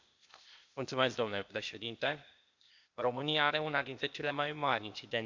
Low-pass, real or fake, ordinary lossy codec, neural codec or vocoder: 7.2 kHz; fake; MP3, 64 kbps; codec, 16 kHz, 0.8 kbps, ZipCodec